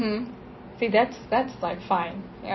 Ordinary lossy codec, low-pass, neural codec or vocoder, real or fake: MP3, 24 kbps; 7.2 kHz; none; real